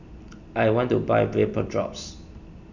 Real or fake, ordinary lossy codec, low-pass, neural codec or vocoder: real; none; 7.2 kHz; none